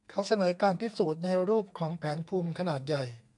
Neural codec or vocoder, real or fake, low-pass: codec, 32 kHz, 1.9 kbps, SNAC; fake; 10.8 kHz